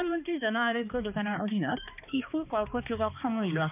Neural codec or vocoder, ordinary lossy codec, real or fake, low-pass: codec, 16 kHz, 2 kbps, X-Codec, HuBERT features, trained on balanced general audio; none; fake; 3.6 kHz